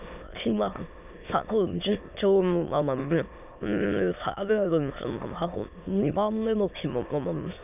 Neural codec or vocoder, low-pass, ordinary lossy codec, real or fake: autoencoder, 22.05 kHz, a latent of 192 numbers a frame, VITS, trained on many speakers; 3.6 kHz; none; fake